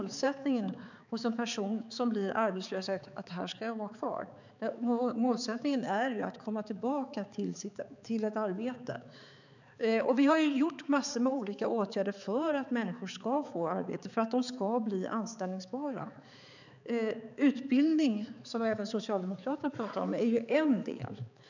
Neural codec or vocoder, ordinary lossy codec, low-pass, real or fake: codec, 16 kHz, 4 kbps, X-Codec, HuBERT features, trained on balanced general audio; none; 7.2 kHz; fake